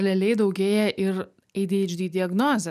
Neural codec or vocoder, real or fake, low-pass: none; real; 14.4 kHz